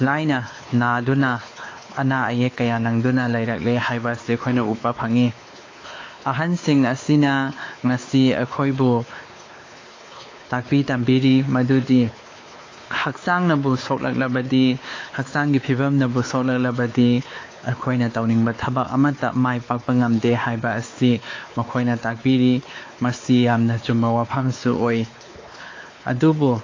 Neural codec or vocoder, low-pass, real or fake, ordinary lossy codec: codec, 16 kHz, 4 kbps, X-Codec, WavLM features, trained on Multilingual LibriSpeech; 7.2 kHz; fake; AAC, 32 kbps